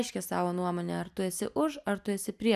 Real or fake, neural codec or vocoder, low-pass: real; none; 14.4 kHz